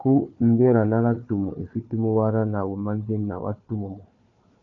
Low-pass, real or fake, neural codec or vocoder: 7.2 kHz; fake; codec, 16 kHz, 4 kbps, FunCodec, trained on Chinese and English, 50 frames a second